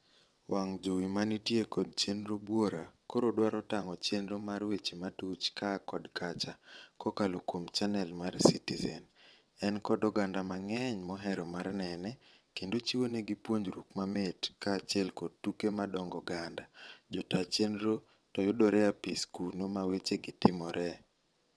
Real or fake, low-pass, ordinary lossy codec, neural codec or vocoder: fake; none; none; vocoder, 22.05 kHz, 80 mel bands, WaveNeXt